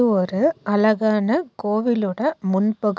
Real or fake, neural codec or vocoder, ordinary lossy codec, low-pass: real; none; none; none